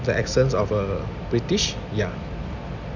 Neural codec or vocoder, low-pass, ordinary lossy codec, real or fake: none; 7.2 kHz; none; real